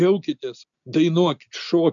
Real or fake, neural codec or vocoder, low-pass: real; none; 7.2 kHz